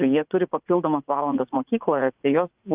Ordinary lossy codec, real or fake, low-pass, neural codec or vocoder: Opus, 24 kbps; fake; 3.6 kHz; vocoder, 44.1 kHz, 80 mel bands, Vocos